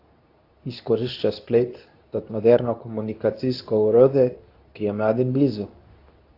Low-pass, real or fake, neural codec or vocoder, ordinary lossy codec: 5.4 kHz; fake; codec, 24 kHz, 0.9 kbps, WavTokenizer, medium speech release version 2; AAC, 32 kbps